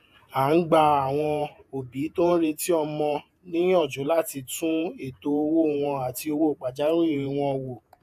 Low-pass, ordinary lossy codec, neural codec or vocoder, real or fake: 14.4 kHz; AAC, 96 kbps; vocoder, 48 kHz, 128 mel bands, Vocos; fake